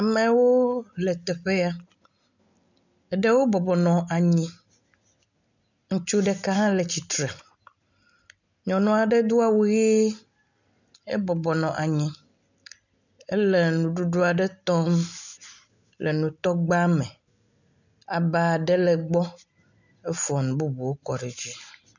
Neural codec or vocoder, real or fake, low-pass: none; real; 7.2 kHz